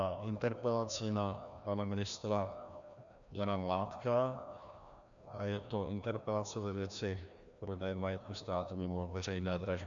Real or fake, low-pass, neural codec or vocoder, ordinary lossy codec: fake; 7.2 kHz; codec, 16 kHz, 1 kbps, FreqCodec, larger model; MP3, 96 kbps